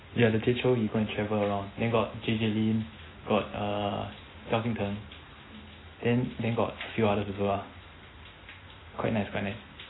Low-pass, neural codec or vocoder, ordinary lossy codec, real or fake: 7.2 kHz; none; AAC, 16 kbps; real